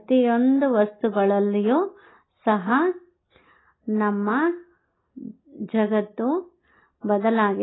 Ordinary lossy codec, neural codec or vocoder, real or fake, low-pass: AAC, 16 kbps; none; real; 7.2 kHz